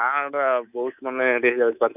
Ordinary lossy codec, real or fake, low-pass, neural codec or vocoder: none; fake; 3.6 kHz; codec, 24 kHz, 3.1 kbps, DualCodec